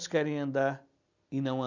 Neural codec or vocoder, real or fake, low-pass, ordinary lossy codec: none; real; 7.2 kHz; none